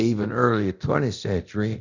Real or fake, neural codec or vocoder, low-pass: fake; codec, 24 kHz, 0.9 kbps, DualCodec; 7.2 kHz